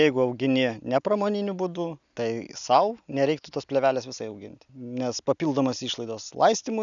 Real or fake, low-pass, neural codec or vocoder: real; 7.2 kHz; none